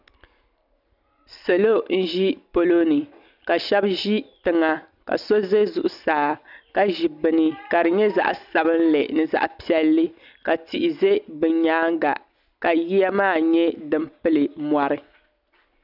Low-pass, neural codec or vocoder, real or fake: 5.4 kHz; none; real